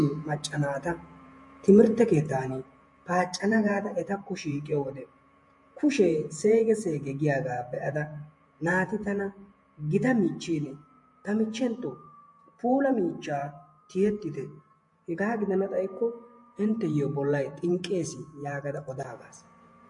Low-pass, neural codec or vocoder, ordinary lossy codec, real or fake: 10.8 kHz; vocoder, 48 kHz, 128 mel bands, Vocos; MP3, 48 kbps; fake